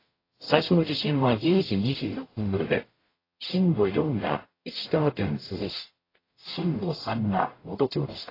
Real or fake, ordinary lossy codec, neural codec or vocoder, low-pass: fake; AAC, 24 kbps; codec, 44.1 kHz, 0.9 kbps, DAC; 5.4 kHz